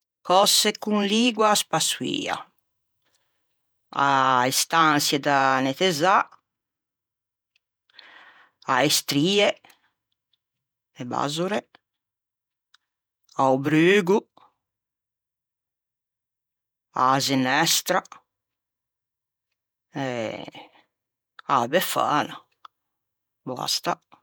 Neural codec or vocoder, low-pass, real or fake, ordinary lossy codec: vocoder, 48 kHz, 128 mel bands, Vocos; none; fake; none